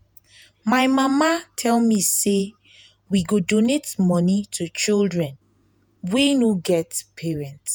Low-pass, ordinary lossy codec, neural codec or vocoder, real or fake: none; none; vocoder, 48 kHz, 128 mel bands, Vocos; fake